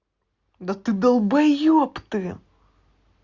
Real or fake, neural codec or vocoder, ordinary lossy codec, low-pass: real; none; Opus, 64 kbps; 7.2 kHz